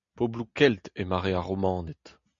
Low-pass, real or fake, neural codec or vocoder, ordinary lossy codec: 7.2 kHz; real; none; MP3, 64 kbps